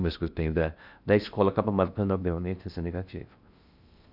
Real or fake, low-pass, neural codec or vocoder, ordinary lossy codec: fake; 5.4 kHz; codec, 16 kHz in and 24 kHz out, 0.6 kbps, FocalCodec, streaming, 2048 codes; none